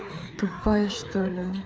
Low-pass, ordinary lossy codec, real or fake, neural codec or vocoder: none; none; fake; codec, 16 kHz, 4 kbps, FreqCodec, larger model